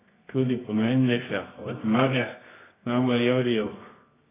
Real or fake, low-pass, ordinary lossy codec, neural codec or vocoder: fake; 3.6 kHz; AAC, 16 kbps; codec, 24 kHz, 0.9 kbps, WavTokenizer, medium music audio release